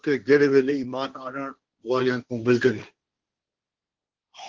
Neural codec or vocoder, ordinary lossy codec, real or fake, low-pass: codec, 16 kHz, 1.1 kbps, Voila-Tokenizer; Opus, 16 kbps; fake; 7.2 kHz